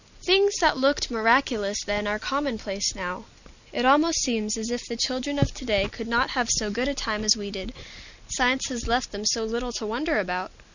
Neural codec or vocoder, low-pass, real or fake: none; 7.2 kHz; real